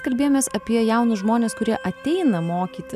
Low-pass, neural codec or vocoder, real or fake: 14.4 kHz; none; real